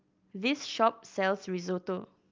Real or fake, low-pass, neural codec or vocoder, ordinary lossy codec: real; 7.2 kHz; none; Opus, 32 kbps